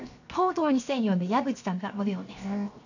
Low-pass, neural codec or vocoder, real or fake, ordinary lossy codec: 7.2 kHz; codec, 16 kHz, 0.7 kbps, FocalCodec; fake; none